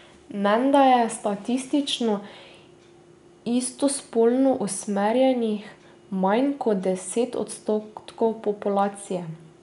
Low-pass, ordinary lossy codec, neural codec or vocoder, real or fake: 10.8 kHz; none; none; real